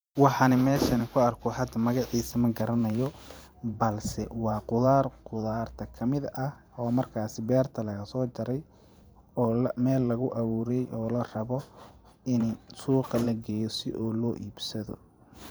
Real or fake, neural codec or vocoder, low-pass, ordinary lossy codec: fake; vocoder, 44.1 kHz, 128 mel bands every 512 samples, BigVGAN v2; none; none